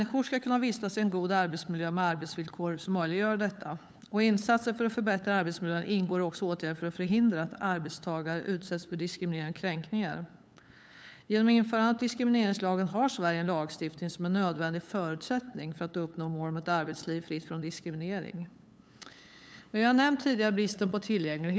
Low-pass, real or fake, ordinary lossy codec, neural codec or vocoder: none; fake; none; codec, 16 kHz, 8 kbps, FunCodec, trained on LibriTTS, 25 frames a second